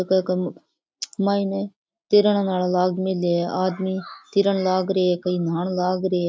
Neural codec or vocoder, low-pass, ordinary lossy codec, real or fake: none; none; none; real